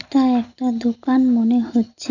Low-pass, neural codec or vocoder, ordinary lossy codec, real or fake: 7.2 kHz; none; none; real